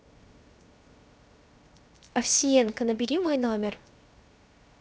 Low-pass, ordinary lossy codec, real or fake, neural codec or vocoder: none; none; fake; codec, 16 kHz, 0.7 kbps, FocalCodec